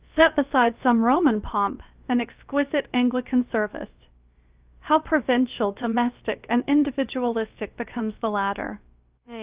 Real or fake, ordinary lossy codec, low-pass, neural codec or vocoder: fake; Opus, 32 kbps; 3.6 kHz; codec, 16 kHz, about 1 kbps, DyCAST, with the encoder's durations